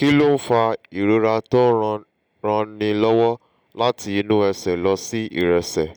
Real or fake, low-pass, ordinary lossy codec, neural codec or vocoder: real; none; none; none